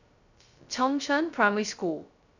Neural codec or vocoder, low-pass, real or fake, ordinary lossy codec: codec, 16 kHz, 0.2 kbps, FocalCodec; 7.2 kHz; fake; none